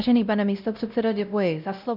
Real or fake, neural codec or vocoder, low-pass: fake; codec, 16 kHz, 0.5 kbps, X-Codec, WavLM features, trained on Multilingual LibriSpeech; 5.4 kHz